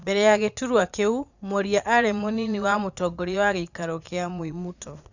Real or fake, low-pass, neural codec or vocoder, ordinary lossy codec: fake; 7.2 kHz; vocoder, 22.05 kHz, 80 mel bands, Vocos; none